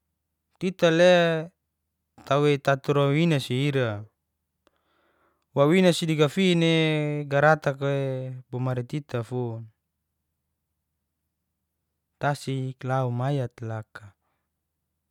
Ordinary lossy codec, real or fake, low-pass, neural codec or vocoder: none; real; 19.8 kHz; none